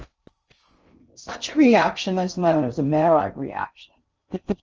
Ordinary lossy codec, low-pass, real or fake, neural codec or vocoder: Opus, 24 kbps; 7.2 kHz; fake; codec, 16 kHz in and 24 kHz out, 0.6 kbps, FocalCodec, streaming, 4096 codes